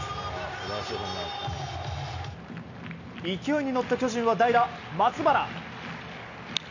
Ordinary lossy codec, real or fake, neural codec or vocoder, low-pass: none; real; none; 7.2 kHz